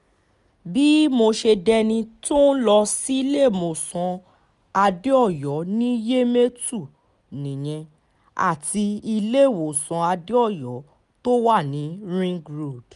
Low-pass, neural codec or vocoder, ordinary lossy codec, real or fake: 10.8 kHz; none; none; real